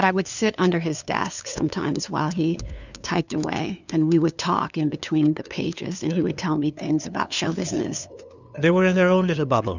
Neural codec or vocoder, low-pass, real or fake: codec, 16 kHz, 2 kbps, FunCodec, trained on LibriTTS, 25 frames a second; 7.2 kHz; fake